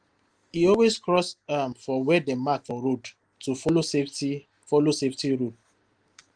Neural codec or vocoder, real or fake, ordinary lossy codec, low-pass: none; real; Opus, 24 kbps; 9.9 kHz